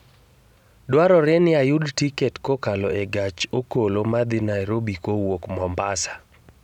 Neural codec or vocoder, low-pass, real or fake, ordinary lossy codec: none; 19.8 kHz; real; none